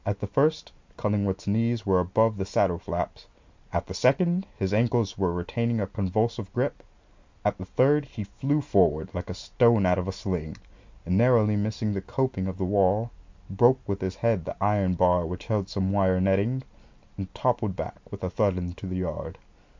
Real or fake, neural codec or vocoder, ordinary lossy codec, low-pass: real; none; MP3, 64 kbps; 7.2 kHz